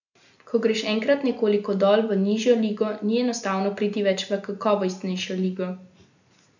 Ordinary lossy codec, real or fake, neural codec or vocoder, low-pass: none; real; none; 7.2 kHz